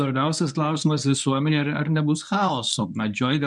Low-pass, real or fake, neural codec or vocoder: 10.8 kHz; fake; codec, 24 kHz, 0.9 kbps, WavTokenizer, medium speech release version 1